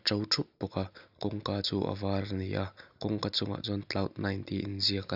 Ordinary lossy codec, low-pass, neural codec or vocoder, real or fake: none; 5.4 kHz; none; real